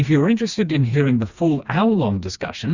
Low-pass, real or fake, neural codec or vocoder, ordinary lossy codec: 7.2 kHz; fake; codec, 16 kHz, 2 kbps, FreqCodec, smaller model; Opus, 64 kbps